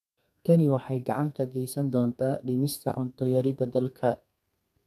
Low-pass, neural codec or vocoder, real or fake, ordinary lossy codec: 14.4 kHz; codec, 32 kHz, 1.9 kbps, SNAC; fake; none